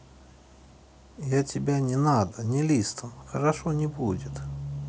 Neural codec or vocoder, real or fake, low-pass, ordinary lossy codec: none; real; none; none